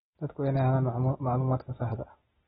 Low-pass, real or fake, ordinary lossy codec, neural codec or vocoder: 7.2 kHz; real; AAC, 16 kbps; none